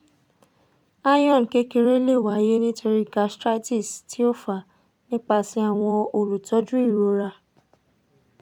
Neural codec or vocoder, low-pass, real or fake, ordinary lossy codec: vocoder, 44.1 kHz, 128 mel bands every 256 samples, BigVGAN v2; 19.8 kHz; fake; none